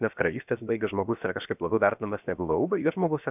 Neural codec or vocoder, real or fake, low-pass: codec, 16 kHz, 0.7 kbps, FocalCodec; fake; 3.6 kHz